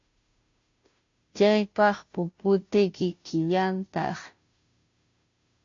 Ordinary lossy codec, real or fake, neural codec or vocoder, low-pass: AAC, 48 kbps; fake; codec, 16 kHz, 0.5 kbps, FunCodec, trained on Chinese and English, 25 frames a second; 7.2 kHz